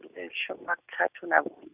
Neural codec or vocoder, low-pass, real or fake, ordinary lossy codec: none; 3.6 kHz; real; none